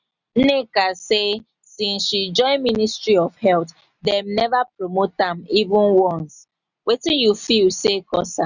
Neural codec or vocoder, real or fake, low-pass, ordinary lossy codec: none; real; 7.2 kHz; none